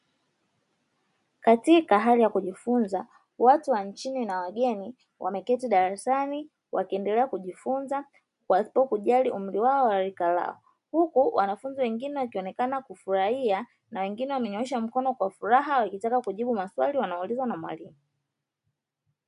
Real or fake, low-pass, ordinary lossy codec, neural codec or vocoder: real; 10.8 kHz; MP3, 64 kbps; none